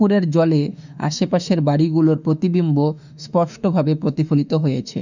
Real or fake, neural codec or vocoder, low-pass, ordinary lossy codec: fake; autoencoder, 48 kHz, 32 numbers a frame, DAC-VAE, trained on Japanese speech; 7.2 kHz; none